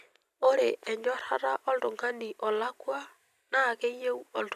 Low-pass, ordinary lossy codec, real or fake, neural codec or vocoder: 14.4 kHz; none; real; none